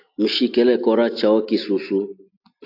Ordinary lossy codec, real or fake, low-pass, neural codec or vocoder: AAC, 32 kbps; real; 5.4 kHz; none